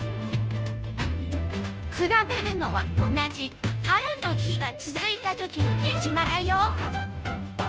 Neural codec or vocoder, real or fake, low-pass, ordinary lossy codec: codec, 16 kHz, 0.5 kbps, FunCodec, trained on Chinese and English, 25 frames a second; fake; none; none